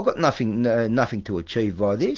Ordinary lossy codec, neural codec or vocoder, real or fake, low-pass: Opus, 16 kbps; none; real; 7.2 kHz